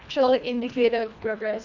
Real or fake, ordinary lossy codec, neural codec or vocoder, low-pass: fake; none; codec, 24 kHz, 1.5 kbps, HILCodec; 7.2 kHz